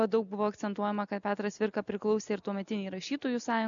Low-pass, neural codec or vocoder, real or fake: 7.2 kHz; none; real